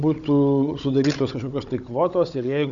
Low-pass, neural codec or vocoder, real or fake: 7.2 kHz; codec, 16 kHz, 16 kbps, FunCodec, trained on Chinese and English, 50 frames a second; fake